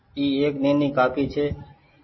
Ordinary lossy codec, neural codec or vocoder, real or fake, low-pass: MP3, 24 kbps; none; real; 7.2 kHz